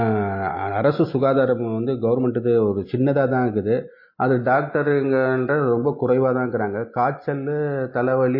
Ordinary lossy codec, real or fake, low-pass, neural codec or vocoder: MP3, 24 kbps; real; 5.4 kHz; none